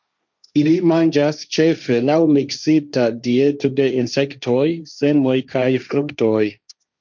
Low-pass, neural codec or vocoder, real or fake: 7.2 kHz; codec, 16 kHz, 1.1 kbps, Voila-Tokenizer; fake